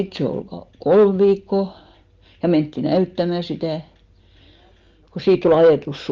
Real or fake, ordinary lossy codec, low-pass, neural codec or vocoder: real; Opus, 24 kbps; 7.2 kHz; none